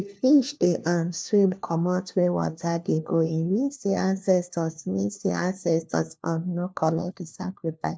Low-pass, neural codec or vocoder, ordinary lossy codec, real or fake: none; codec, 16 kHz, 1 kbps, FunCodec, trained on LibriTTS, 50 frames a second; none; fake